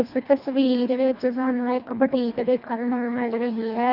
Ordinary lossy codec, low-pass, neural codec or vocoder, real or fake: Opus, 64 kbps; 5.4 kHz; codec, 24 kHz, 1.5 kbps, HILCodec; fake